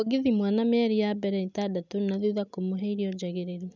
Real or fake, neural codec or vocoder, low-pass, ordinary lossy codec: real; none; 7.2 kHz; none